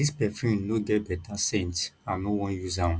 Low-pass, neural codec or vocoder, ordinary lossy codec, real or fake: none; none; none; real